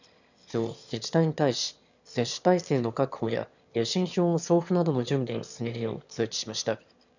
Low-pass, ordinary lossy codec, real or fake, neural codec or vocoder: 7.2 kHz; none; fake; autoencoder, 22.05 kHz, a latent of 192 numbers a frame, VITS, trained on one speaker